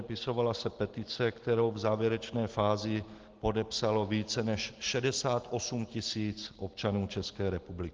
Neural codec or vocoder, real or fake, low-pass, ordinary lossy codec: none; real; 7.2 kHz; Opus, 16 kbps